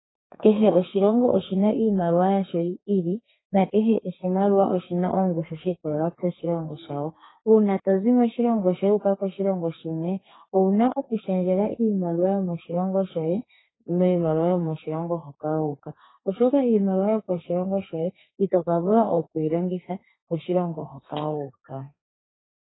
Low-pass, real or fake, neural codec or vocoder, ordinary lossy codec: 7.2 kHz; fake; codec, 32 kHz, 1.9 kbps, SNAC; AAC, 16 kbps